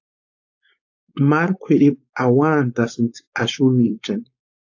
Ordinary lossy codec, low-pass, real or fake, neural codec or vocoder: AAC, 48 kbps; 7.2 kHz; fake; codec, 16 kHz, 4.8 kbps, FACodec